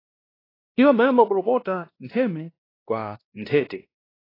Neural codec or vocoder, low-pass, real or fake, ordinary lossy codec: codec, 16 kHz, 1 kbps, X-Codec, WavLM features, trained on Multilingual LibriSpeech; 5.4 kHz; fake; AAC, 24 kbps